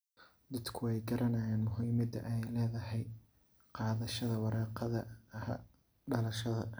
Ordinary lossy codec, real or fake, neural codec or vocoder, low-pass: none; real; none; none